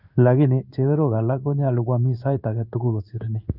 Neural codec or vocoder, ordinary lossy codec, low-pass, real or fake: codec, 16 kHz in and 24 kHz out, 1 kbps, XY-Tokenizer; none; 5.4 kHz; fake